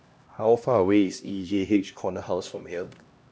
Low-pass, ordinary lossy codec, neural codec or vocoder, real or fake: none; none; codec, 16 kHz, 1 kbps, X-Codec, HuBERT features, trained on LibriSpeech; fake